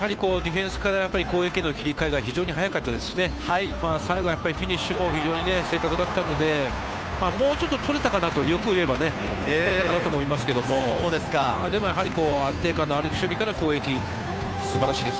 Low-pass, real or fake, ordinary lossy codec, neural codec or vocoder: none; fake; none; codec, 16 kHz, 2 kbps, FunCodec, trained on Chinese and English, 25 frames a second